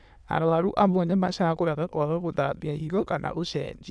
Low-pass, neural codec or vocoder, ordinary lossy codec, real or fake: none; autoencoder, 22.05 kHz, a latent of 192 numbers a frame, VITS, trained on many speakers; none; fake